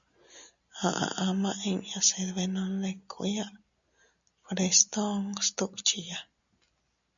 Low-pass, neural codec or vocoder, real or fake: 7.2 kHz; none; real